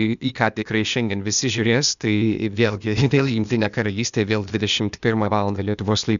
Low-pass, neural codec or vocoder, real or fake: 7.2 kHz; codec, 16 kHz, 0.8 kbps, ZipCodec; fake